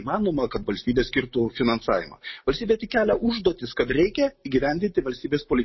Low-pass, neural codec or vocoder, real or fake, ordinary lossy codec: 7.2 kHz; none; real; MP3, 24 kbps